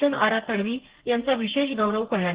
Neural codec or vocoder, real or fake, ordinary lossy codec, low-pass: codec, 32 kHz, 1.9 kbps, SNAC; fake; Opus, 16 kbps; 3.6 kHz